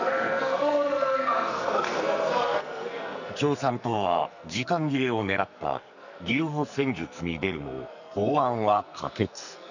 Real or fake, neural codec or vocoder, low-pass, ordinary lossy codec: fake; codec, 44.1 kHz, 2.6 kbps, SNAC; 7.2 kHz; none